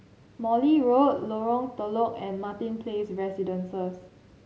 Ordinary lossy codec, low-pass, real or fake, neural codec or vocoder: none; none; real; none